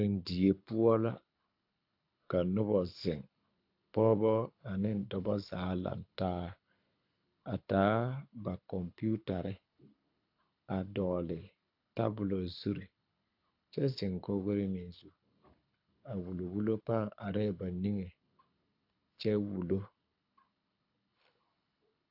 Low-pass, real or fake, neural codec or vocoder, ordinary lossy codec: 5.4 kHz; fake; codec, 24 kHz, 6 kbps, HILCodec; AAC, 48 kbps